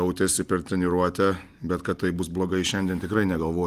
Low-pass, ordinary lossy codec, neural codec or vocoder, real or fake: 14.4 kHz; Opus, 32 kbps; none; real